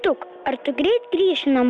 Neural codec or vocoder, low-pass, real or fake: none; 10.8 kHz; real